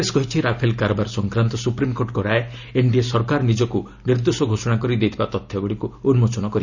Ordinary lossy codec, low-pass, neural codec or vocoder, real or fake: none; 7.2 kHz; none; real